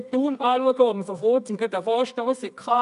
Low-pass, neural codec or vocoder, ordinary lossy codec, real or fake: 10.8 kHz; codec, 24 kHz, 0.9 kbps, WavTokenizer, medium music audio release; MP3, 96 kbps; fake